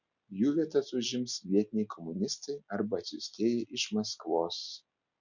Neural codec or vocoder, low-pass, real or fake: none; 7.2 kHz; real